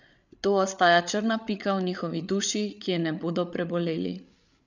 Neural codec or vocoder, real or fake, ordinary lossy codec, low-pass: codec, 16 kHz, 8 kbps, FreqCodec, larger model; fake; none; 7.2 kHz